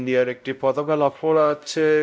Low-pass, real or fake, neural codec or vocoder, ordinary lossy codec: none; fake; codec, 16 kHz, 0.5 kbps, X-Codec, WavLM features, trained on Multilingual LibriSpeech; none